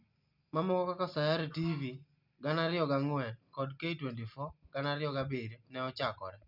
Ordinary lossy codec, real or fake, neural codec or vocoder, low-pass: none; real; none; 5.4 kHz